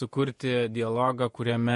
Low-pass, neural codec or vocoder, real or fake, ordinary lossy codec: 14.4 kHz; vocoder, 48 kHz, 128 mel bands, Vocos; fake; MP3, 48 kbps